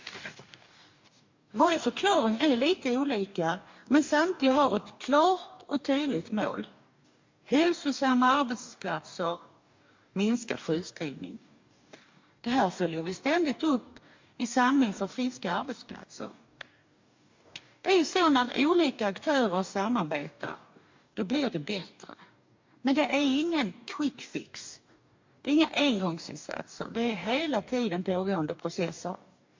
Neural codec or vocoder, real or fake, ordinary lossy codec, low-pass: codec, 44.1 kHz, 2.6 kbps, DAC; fake; MP3, 48 kbps; 7.2 kHz